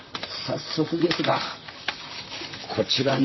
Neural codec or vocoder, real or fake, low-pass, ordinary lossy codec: vocoder, 44.1 kHz, 128 mel bands, Pupu-Vocoder; fake; 7.2 kHz; MP3, 24 kbps